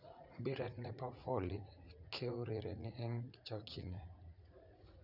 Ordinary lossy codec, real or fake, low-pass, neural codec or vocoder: none; fake; 5.4 kHz; vocoder, 44.1 kHz, 80 mel bands, Vocos